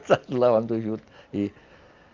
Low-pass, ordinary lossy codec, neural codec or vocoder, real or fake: 7.2 kHz; Opus, 24 kbps; none; real